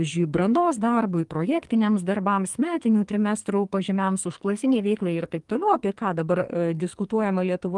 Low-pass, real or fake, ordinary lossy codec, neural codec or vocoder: 10.8 kHz; fake; Opus, 24 kbps; codec, 44.1 kHz, 2.6 kbps, SNAC